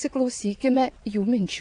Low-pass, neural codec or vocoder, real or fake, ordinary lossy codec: 9.9 kHz; vocoder, 22.05 kHz, 80 mel bands, WaveNeXt; fake; AAC, 48 kbps